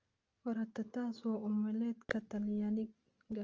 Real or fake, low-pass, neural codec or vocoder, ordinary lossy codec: real; 7.2 kHz; none; Opus, 24 kbps